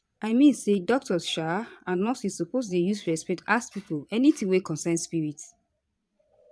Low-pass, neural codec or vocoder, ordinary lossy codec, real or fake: none; vocoder, 22.05 kHz, 80 mel bands, Vocos; none; fake